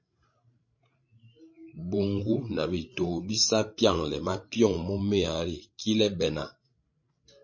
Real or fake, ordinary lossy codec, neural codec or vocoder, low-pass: fake; MP3, 32 kbps; codec, 16 kHz, 16 kbps, FreqCodec, larger model; 7.2 kHz